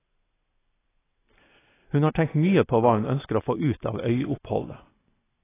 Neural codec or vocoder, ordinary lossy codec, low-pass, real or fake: none; AAC, 16 kbps; 3.6 kHz; real